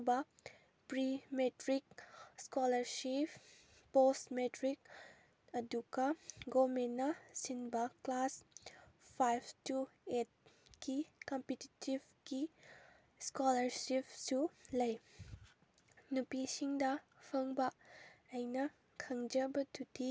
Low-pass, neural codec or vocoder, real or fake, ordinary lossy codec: none; none; real; none